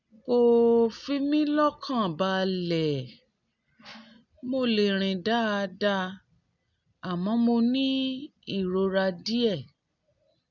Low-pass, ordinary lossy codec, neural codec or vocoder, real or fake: 7.2 kHz; none; none; real